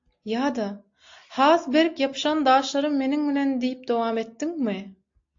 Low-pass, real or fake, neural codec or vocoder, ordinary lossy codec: 7.2 kHz; real; none; AAC, 48 kbps